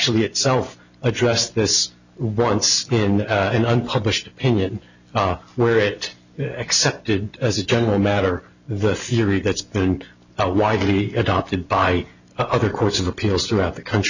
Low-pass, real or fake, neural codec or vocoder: 7.2 kHz; real; none